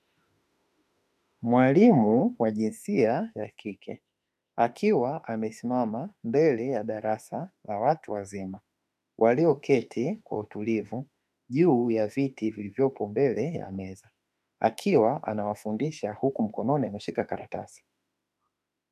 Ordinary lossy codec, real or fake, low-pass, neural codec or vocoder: MP3, 96 kbps; fake; 14.4 kHz; autoencoder, 48 kHz, 32 numbers a frame, DAC-VAE, trained on Japanese speech